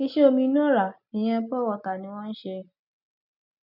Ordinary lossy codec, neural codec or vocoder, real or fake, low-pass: none; none; real; 5.4 kHz